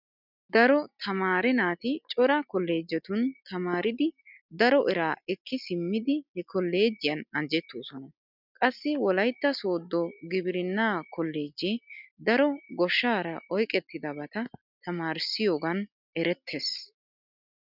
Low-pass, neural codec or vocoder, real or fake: 5.4 kHz; none; real